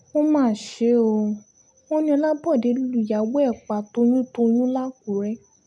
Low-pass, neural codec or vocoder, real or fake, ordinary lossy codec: none; none; real; none